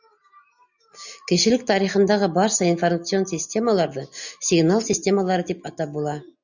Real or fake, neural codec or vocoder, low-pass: real; none; 7.2 kHz